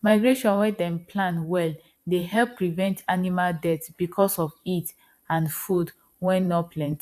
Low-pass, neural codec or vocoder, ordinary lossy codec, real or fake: 14.4 kHz; vocoder, 44.1 kHz, 128 mel bands every 512 samples, BigVGAN v2; AAC, 96 kbps; fake